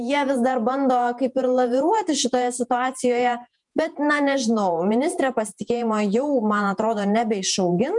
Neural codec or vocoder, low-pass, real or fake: vocoder, 48 kHz, 128 mel bands, Vocos; 10.8 kHz; fake